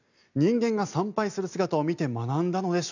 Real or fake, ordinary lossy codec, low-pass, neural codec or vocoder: real; none; 7.2 kHz; none